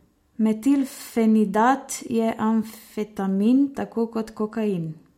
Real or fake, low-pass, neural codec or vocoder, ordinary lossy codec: real; 19.8 kHz; none; MP3, 64 kbps